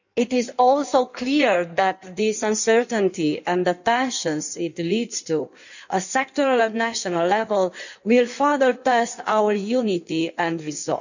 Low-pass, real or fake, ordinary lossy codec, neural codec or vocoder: 7.2 kHz; fake; none; codec, 16 kHz in and 24 kHz out, 1.1 kbps, FireRedTTS-2 codec